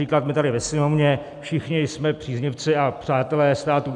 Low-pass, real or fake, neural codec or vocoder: 10.8 kHz; real; none